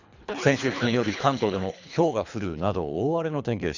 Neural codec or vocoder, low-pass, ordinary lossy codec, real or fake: codec, 24 kHz, 3 kbps, HILCodec; 7.2 kHz; Opus, 64 kbps; fake